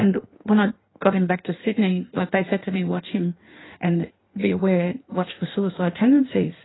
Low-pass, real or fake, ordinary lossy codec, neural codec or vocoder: 7.2 kHz; fake; AAC, 16 kbps; codec, 16 kHz in and 24 kHz out, 1.1 kbps, FireRedTTS-2 codec